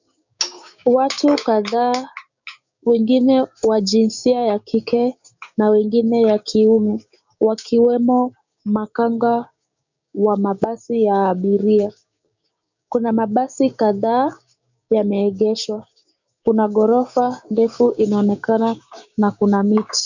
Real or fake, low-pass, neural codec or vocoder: fake; 7.2 kHz; codec, 16 kHz, 6 kbps, DAC